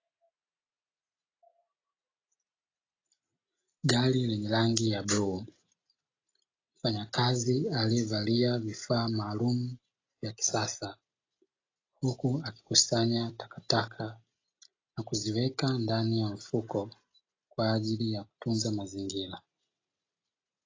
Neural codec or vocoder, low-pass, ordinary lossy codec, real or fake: none; 7.2 kHz; AAC, 32 kbps; real